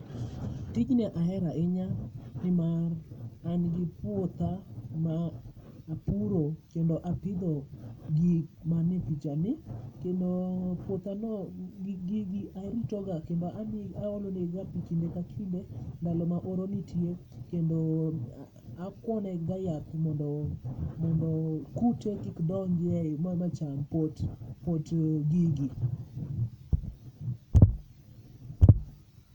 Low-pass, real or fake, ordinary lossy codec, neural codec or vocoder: 19.8 kHz; real; none; none